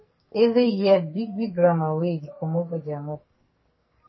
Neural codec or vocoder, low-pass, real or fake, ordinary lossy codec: codec, 44.1 kHz, 2.6 kbps, SNAC; 7.2 kHz; fake; MP3, 24 kbps